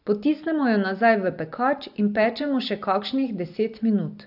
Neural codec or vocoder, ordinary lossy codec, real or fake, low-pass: none; AAC, 48 kbps; real; 5.4 kHz